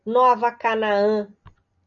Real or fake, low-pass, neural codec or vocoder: real; 7.2 kHz; none